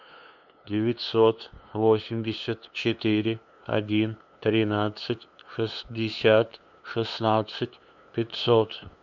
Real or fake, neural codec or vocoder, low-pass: fake; codec, 16 kHz, 2 kbps, FunCodec, trained on LibriTTS, 25 frames a second; 7.2 kHz